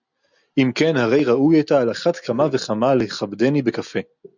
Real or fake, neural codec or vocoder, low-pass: real; none; 7.2 kHz